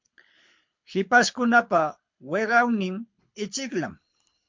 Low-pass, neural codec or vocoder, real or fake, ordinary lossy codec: 7.2 kHz; codec, 24 kHz, 6 kbps, HILCodec; fake; MP3, 48 kbps